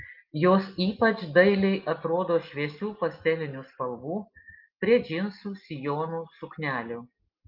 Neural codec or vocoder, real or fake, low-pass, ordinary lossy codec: none; real; 5.4 kHz; Opus, 32 kbps